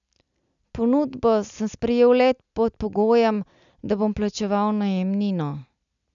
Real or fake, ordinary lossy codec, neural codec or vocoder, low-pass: real; none; none; 7.2 kHz